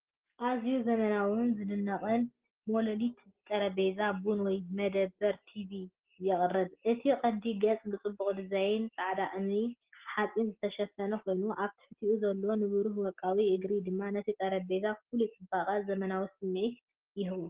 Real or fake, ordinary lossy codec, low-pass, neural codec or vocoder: real; Opus, 16 kbps; 3.6 kHz; none